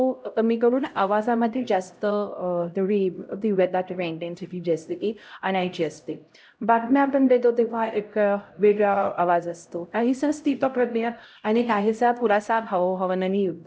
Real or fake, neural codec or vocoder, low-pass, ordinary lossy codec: fake; codec, 16 kHz, 0.5 kbps, X-Codec, HuBERT features, trained on LibriSpeech; none; none